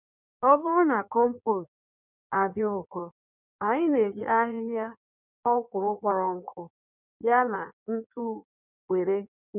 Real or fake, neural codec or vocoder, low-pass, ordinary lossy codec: fake; codec, 16 kHz in and 24 kHz out, 1.1 kbps, FireRedTTS-2 codec; 3.6 kHz; none